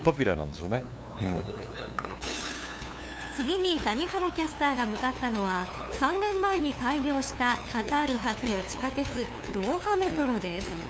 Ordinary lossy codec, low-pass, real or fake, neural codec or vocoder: none; none; fake; codec, 16 kHz, 2 kbps, FunCodec, trained on LibriTTS, 25 frames a second